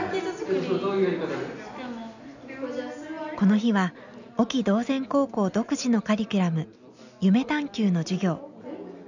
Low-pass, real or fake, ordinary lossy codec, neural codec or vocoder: 7.2 kHz; real; none; none